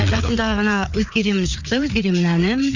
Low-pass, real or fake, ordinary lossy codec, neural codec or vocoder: 7.2 kHz; fake; none; codec, 16 kHz, 4 kbps, FreqCodec, larger model